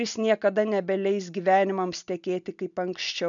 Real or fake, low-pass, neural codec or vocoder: real; 7.2 kHz; none